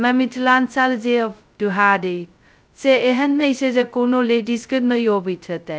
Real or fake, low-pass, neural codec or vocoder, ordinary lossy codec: fake; none; codec, 16 kHz, 0.2 kbps, FocalCodec; none